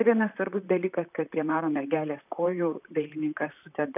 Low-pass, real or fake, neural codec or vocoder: 3.6 kHz; fake; vocoder, 44.1 kHz, 128 mel bands, Pupu-Vocoder